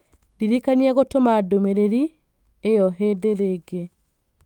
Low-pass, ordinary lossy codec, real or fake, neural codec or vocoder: 19.8 kHz; Opus, 32 kbps; fake; autoencoder, 48 kHz, 128 numbers a frame, DAC-VAE, trained on Japanese speech